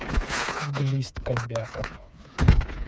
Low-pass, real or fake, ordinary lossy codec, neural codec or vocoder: none; fake; none; codec, 16 kHz, 4 kbps, FreqCodec, smaller model